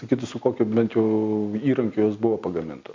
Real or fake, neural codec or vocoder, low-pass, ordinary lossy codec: real; none; 7.2 kHz; MP3, 48 kbps